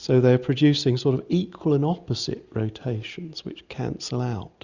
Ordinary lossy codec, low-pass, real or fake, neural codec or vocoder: Opus, 64 kbps; 7.2 kHz; real; none